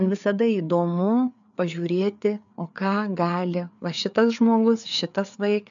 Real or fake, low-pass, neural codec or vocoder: fake; 7.2 kHz; codec, 16 kHz, 4 kbps, FreqCodec, larger model